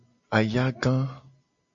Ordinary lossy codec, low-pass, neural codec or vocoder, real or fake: AAC, 64 kbps; 7.2 kHz; none; real